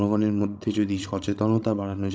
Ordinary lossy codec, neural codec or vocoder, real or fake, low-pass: none; codec, 16 kHz, 16 kbps, FreqCodec, larger model; fake; none